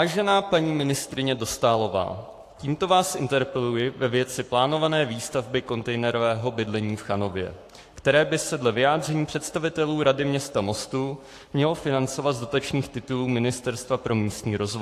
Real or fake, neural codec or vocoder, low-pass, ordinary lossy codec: fake; codec, 44.1 kHz, 7.8 kbps, Pupu-Codec; 14.4 kHz; AAC, 64 kbps